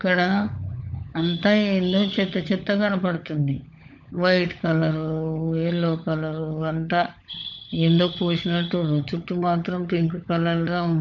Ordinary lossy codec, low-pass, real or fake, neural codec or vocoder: none; 7.2 kHz; fake; codec, 16 kHz, 16 kbps, FunCodec, trained on LibriTTS, 50 frames a second